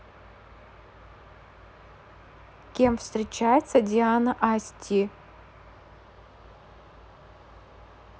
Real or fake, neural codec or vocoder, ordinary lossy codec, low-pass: real; none; none; none